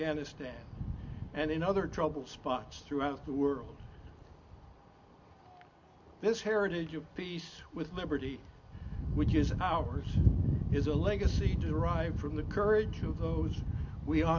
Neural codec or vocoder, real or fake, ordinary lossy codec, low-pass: none; real; MP3, 64 kbps; 7.2 kHz